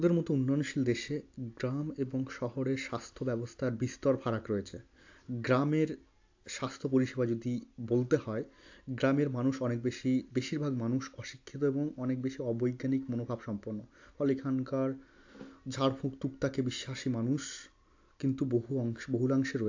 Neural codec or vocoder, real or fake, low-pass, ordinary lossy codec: none; real; 7.2 kHz; AAC, 48 kbps